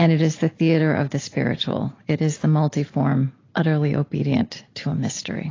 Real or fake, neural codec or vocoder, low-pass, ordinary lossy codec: real; none; 7.2 kHz; AAC, 32 kbps